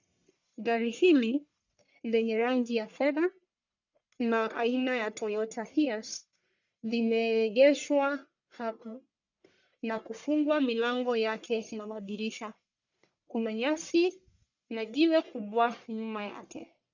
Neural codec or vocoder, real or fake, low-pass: codec, 44.1 kHz, 1.7 kbps, Pupu-Codec; fake; 7.2 kHz